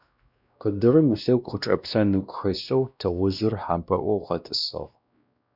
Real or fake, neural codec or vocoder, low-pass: fake; codec, 16 kHz, 1 kbps, X-Codec, WavLM features, trained on Multilingual LibriSpeech; 5.4 kHz